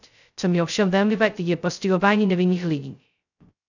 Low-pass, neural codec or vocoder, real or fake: 7.2 kHz; codec, 16 kHz, 0.2 kbps, FocalCodec; fake